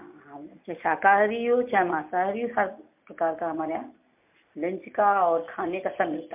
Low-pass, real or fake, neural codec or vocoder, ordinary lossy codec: 3.6 kHz; real; none; MP3, 32 kbps